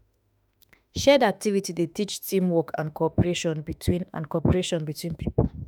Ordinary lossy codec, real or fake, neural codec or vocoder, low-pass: none; fake; autoencoder, 48 kHz, 32 numbers a frame, DAC-VAE, trained on Japanese speech; none